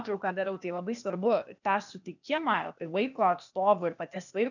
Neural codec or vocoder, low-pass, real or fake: codec, 16 kHz, 0.8 kbps, ZipCodec; 7.2 kHz; fake